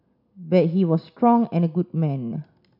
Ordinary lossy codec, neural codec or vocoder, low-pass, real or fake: none; none; 5.4 kHz; real